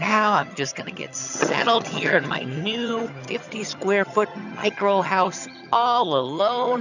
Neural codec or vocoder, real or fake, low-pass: vocoder, 22.05 kHz, 80 mel bands, HiFi-GAN; fake; 7.2 kHz